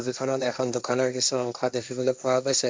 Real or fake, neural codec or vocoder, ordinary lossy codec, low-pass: fake; codec, 16 kHz, 1.1 kbps, Voila-Tokenizer; none; none